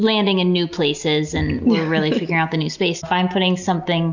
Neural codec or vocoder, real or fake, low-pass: none; real; 7.2 kHz